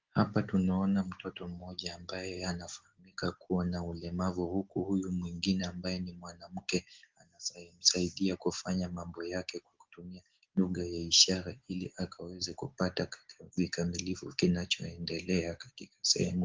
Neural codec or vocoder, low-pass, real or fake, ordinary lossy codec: none; 7.2 kHz; real; Opus, 16 kbps